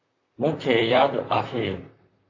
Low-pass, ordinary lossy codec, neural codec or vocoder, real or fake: 7.2 kHz; AAC, 48 kbps; vocoder, 44.1 kHz, 128 mel bands, Pupu-Vocoder; fake